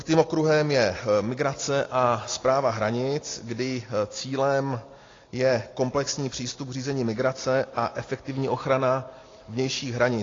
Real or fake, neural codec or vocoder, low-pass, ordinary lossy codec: real; none; 7.2 kHz; AAC, 32 kbps